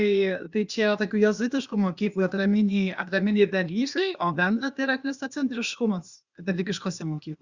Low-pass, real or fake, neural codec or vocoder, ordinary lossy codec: 7.2 kHz; fake; codec, 16 kHz, 0.8 kbps, ZipCodec; Opus, 64 kbps